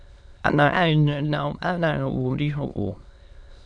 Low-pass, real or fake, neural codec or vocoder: 9.9 kHz; fake; autoencoder, 22.05 kHz, a latent of 192 numbers a frame, VITS, trained on many speakers